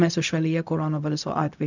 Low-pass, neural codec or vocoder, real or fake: 7.2 kHz; codec, 16 kHz, 0.4 kbps, LongCat-Audio-Codec; fake